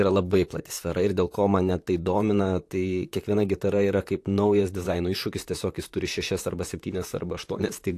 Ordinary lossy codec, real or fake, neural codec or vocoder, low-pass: AAC, 64 kbps; fake; vocoder, 44.1 kHz, 128 mel bands, Pupu-Vocoder; 14.4 kHz